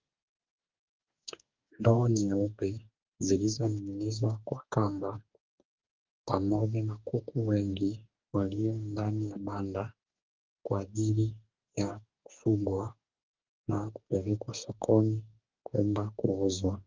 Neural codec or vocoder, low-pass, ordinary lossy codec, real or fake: codec, 44.1 kHz, 2.6 kbps, DAC; 7.2 kHz; Opus, 24 kbps; fake